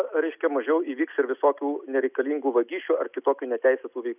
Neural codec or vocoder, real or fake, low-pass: none; real; 3.6 kHz